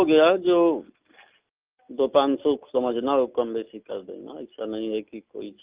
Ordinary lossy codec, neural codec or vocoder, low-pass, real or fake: Opus, 24 kbps; none; 3.6 kHz; real